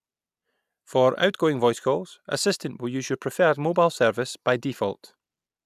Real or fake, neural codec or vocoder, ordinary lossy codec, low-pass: real; none; none; 14.4 kHz